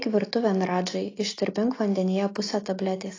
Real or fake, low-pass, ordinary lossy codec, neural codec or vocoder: real; 7.2 kHz; AAC, 32 kbps; none